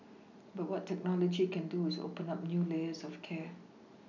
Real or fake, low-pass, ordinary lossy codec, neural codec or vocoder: real; 7.2 kHz; none; none